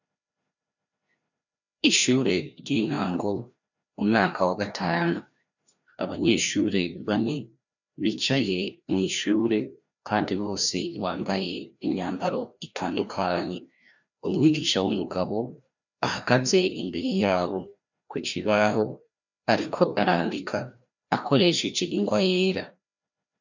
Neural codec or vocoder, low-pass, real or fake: codec, 16 kHz, 1 kbps, FreqCodec, larger model; 7.2 kHz; fake